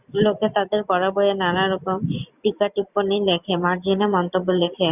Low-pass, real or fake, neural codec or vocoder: 3.6 kHz; real; none